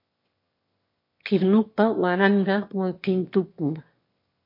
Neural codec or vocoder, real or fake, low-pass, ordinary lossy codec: autoencoder, 22.05 kHz, a latent of 192 numbers a frame, VITS, trained on one speaker; fake; 5.4 kHz; MP3, 32 kbps